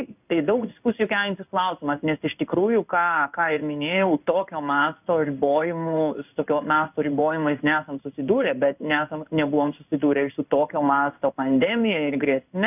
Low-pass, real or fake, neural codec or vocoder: 3.6 kHz; fake; codec, 16 kHz in and 24 kHz out, 1 kbps, XY-Tokenizer